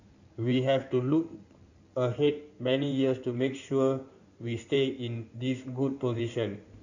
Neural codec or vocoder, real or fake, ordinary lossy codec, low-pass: codec, 16 kHz in and 24 kHz out, 2.2 kbps, FireRedTTS-2 codec; fake; none; 7.2 kHz